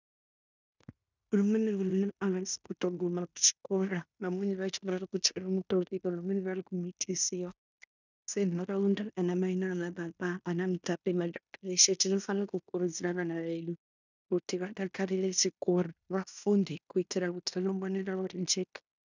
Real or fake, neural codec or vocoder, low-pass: fake; codec, 16 kHz in and 24 kHz out, 0.9 kbps, LongCat-Audio-Codec, fine tuned four codebook decoder; 7.2 kHz